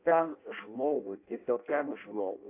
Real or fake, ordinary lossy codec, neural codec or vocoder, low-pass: fake; AAC, 24 kbps; codec, 16 kHz in and 24 kHz out, 0.6 kbps, FireRedTTS-2 codec; 3.6 kHz